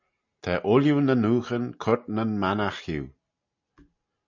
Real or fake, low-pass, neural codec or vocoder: real; 7.2 kHz; none